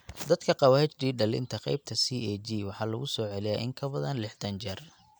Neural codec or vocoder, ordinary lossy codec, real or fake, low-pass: none; none; real; none